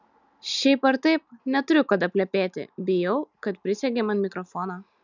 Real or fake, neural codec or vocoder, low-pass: real; none; 7.2 kHz